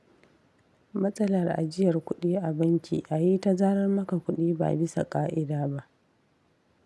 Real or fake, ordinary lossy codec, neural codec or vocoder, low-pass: real; none; none; none